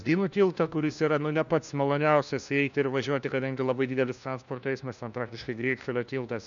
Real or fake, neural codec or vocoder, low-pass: fake; codec, 16 kHz, 1 kbps, FunCodec, trained on LibriTTS, 50 frames a second; 7.2 kHz